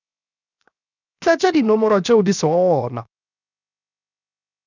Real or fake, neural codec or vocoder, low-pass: fake; codec, 16 kHz, 0.7 kbps, FocalCodec; 7.2 kHz